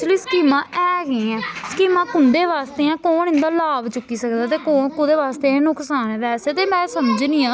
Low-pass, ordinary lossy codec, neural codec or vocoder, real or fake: none; none; none; real